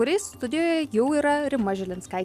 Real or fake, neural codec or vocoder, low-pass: real; none; 14.4 kHz